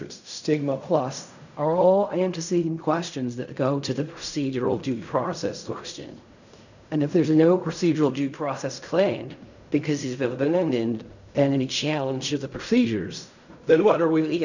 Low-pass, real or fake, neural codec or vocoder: 7.2 kHz; fake; codec, 16 kHz in and 24 kHz out, 0.4 kbps, LongCat-Audio-Codec, fine tuned four codebook decoder